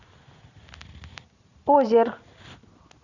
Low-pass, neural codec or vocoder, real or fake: 7.2 kHz; none; real